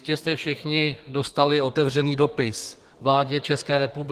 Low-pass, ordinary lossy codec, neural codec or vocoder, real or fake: 14.4 kHz; Opus, 24 kbps; codec, 32 kHz, 1.9 kbps, SNAC; fake